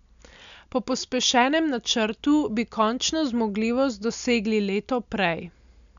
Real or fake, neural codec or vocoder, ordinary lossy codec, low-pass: real; none; MP3, 96 kbps; 7.2 kHz